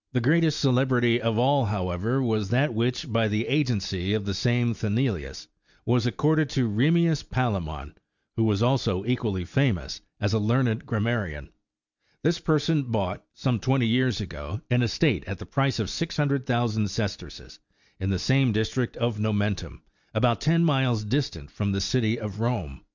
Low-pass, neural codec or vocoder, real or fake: 7.2 kHz; none; real